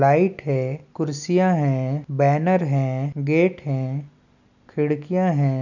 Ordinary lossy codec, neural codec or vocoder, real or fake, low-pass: none; none; real; 7.2 kHz